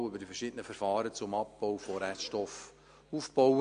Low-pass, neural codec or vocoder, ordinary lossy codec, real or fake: 9.9 kHz; none; MP3, 48 kbps; real